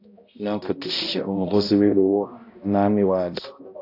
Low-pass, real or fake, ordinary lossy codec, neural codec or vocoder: 5.4 kHz; fake; AAC, 32 kbps; codec, 16 kHz, 0.5 kbps, X-Codec, HuBERT features, trained on balanced general audio